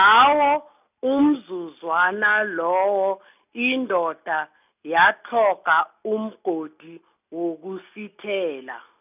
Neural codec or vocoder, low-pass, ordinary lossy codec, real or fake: none; 3.6 kHz; none; real